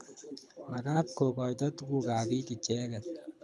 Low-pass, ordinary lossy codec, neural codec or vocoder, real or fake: none; none; codec, 24 kHz, 6 kbps, HILCodec; fake